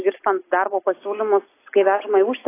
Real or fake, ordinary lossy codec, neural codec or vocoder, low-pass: real; AAC, 24 kbps; none; 3.6 kHz